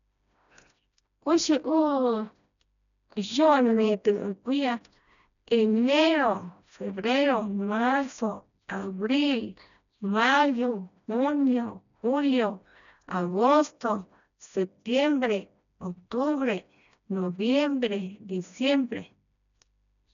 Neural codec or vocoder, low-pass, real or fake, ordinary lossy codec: codec, 16 kHz, 1 kbps, FreqCodec, smaller model; 7.2 kHz; fake; none